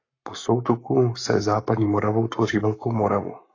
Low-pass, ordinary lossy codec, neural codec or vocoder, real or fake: 7.2 kHz; AAC, 32 kbps; codec, 44.1 kHz, 7.8 kbps, Pupu-Codec; fake